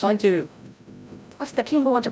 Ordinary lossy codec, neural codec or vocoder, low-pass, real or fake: none; codec, 16 kHz, 0.5 kbps, FreqCodec, larger model; none; fake